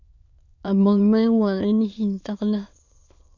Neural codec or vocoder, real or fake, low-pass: autoencoder, 22.05 kHz, a latent of 192 numbers a frame, VITS, trained on many speakers; fake; 7.2 kHz